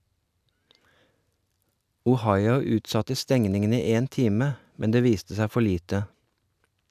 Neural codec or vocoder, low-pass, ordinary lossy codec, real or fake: none; 14.4 kHz; none; real